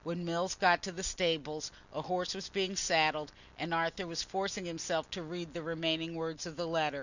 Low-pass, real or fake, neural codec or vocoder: 7.2 kHz; real; none